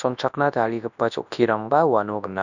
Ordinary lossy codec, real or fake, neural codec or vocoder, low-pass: none; fake; codec, 24 kHz, 0.9 kbps, WavTokenizer, large speech release; 7.2 kHz